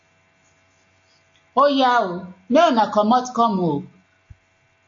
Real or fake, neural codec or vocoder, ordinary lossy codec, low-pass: real; none; AAC, 48 kbps; 7.2 kHz